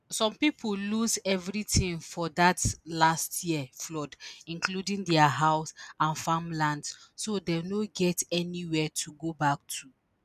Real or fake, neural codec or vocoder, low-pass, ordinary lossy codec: real; none; 14.4 kHz; none